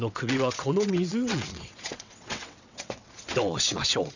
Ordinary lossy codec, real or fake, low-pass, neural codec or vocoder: none; fake; 7.2 kHz; vocoder, 22.05 kHz, 80 mel bands, WaveNeXt